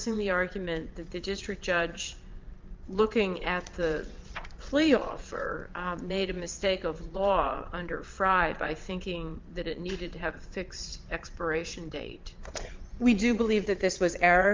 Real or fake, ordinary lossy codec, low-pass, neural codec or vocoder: fake; Opus, 24 kbps; 7.2 kHz; vocoder, 22.05 kHz, 80 mel bands, Vocos